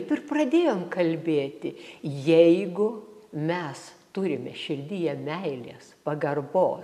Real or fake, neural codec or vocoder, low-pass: real; none; 14.4 kHz